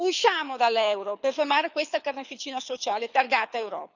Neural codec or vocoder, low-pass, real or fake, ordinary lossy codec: codec, 24 kHz, 6 kbps, HILCodec; 7.2 kHz; fake; none